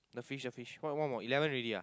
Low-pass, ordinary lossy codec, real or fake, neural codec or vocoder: none; none; real; none